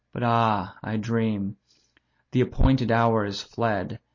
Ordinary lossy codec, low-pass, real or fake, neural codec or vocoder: MP3, 32 kbps; 7.2 kHz; real; none